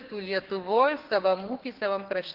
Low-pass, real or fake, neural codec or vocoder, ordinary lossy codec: 5.4 kHz; fake; codec, 32 kHz, 1.9 kbps, SNAC; Opus, 16 kbps